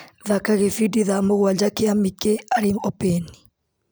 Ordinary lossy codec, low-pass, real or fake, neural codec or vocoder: none; none; real; none